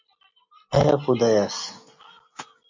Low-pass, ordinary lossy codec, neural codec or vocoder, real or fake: 7.2 kHz; MP3, 48 kbps; none; real